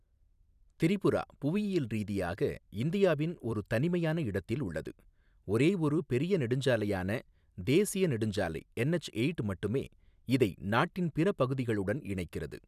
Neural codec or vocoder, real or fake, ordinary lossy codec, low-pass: vocoder, 44.1 kHz, 128 mel bands every 256 samples, BigVGAN v2; fake; none; 14.4 kHz